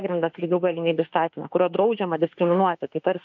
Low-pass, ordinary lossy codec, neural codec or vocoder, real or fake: 7.2 kHz; MP3, 64 kbps; autoencoder, 48 kHz, 32 numbers a frame, DAC-VAE, trained on Japanese speech; fake